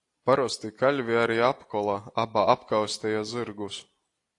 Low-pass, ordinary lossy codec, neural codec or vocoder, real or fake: 10.8 kHz; AAC, 48 kbps; none; real